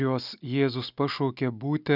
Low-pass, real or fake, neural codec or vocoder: 5.4 kHz; real; none